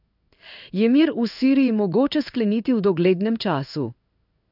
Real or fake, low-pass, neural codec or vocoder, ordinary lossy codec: fake; 5.4 kHz; codec, 16 kHz in and 24 kHz out, 1 kbps, XY-Tokenizer; none